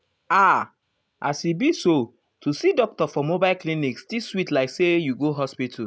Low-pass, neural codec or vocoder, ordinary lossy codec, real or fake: none; none; none; real